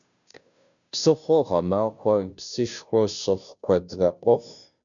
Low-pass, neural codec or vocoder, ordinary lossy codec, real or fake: 7.2 kHz; codec, 16 kHz, 0.5 kbps, FunCodec, trained on Chinese and English, 25 frames a second; MP3, 96 kbps; fake